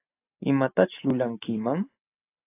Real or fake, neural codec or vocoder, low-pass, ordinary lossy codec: real; none; 3.6 kHz; AAC, 24 kbps